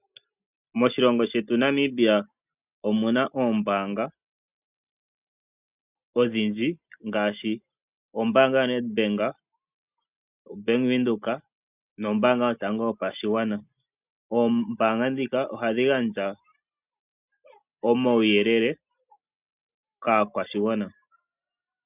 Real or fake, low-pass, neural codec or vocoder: real; 3.6 kHz; none